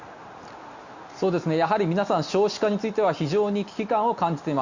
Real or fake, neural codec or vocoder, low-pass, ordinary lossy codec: real; none; 7.2 kHz; Opus, 64 kbps